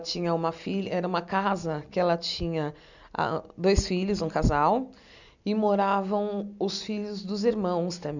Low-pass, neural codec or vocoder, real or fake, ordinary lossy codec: 7.2 kHz; none; real; none